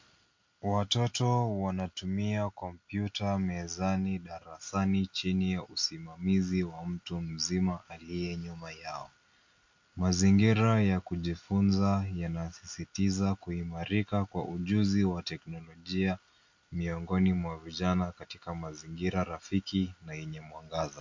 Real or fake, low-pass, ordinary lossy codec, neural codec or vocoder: real; 7.2 kHz; MP3, 48 kbps; none